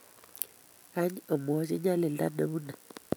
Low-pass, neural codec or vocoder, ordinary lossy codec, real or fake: none; none; none; real